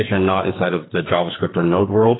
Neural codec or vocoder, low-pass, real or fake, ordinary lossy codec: codec, 44.1 kHz, 2.6 kbps, SNAC; 7.2 kHz; fake; AAC, 16 kbps